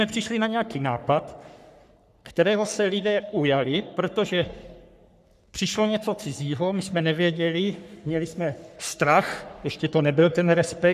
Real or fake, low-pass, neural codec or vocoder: fake; 14.4 kHz; codec, 44.1 kHz, 3.4 kbps, Pupu-Codec